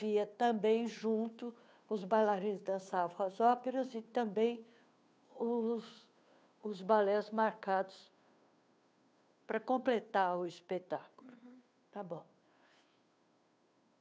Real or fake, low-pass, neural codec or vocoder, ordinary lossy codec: fake; none; codec, 16 kHz, 2 kbps, FunCodec, trained on Chinese and English, 25 frames a second; none